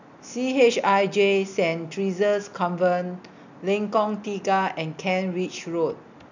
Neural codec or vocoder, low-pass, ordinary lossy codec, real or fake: none; 7.2 kHz; none; real